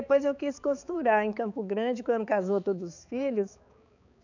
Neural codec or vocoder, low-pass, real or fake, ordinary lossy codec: codec, 16 kHz, 4 kbps, X-Codec, HuBERT features, trained on balanced general audio; 7.2 kHz; fake; none